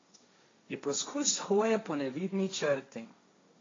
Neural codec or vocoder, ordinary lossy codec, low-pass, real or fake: codec, 16 kHz, 1.1 kbps, Voila-Tokenizer; AAC, 32 kbps; 7.2 kHz; fake